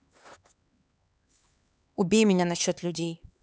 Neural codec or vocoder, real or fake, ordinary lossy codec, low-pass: codec, 16 kHz, 4 kbps, X-Codec, HuBERT features, trained on LibriSpeech; fake; none; none